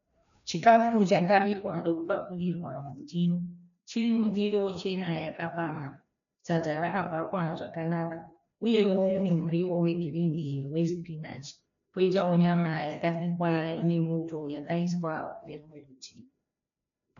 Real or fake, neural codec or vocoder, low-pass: fake; codec, 16 kHz, 1 kbps, FreqCodec, larger model; 7.2 kHz